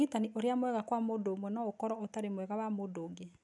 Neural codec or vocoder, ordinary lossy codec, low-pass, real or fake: none; none; 10.8 kHz; real